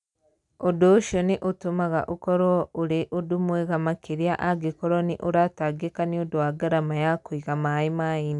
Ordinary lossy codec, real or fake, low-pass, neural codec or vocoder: none; real; 10.8 kHz; none